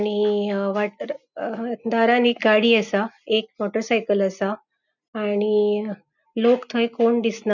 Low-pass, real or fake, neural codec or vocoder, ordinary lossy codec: 7.2 kHz; real; none; none